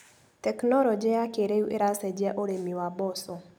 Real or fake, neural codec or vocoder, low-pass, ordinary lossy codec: real; none; none; none